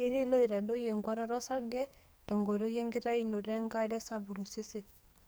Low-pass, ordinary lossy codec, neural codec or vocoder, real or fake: none; none; codec, 44.1 kHz, 2.6 kbps, SNAC; fake